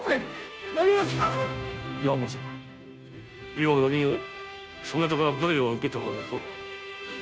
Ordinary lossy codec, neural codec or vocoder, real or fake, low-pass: none; codec, 16 kHz, 0.5 kbps, FunCodec, trained on Chinese and English, 25 frames a second; fake; none